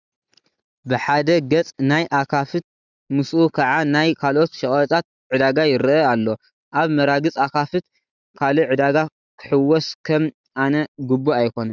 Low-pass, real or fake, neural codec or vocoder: 7.2 kHz; real; none